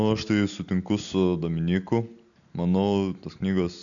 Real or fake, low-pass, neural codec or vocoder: real; 7.2 kHz; none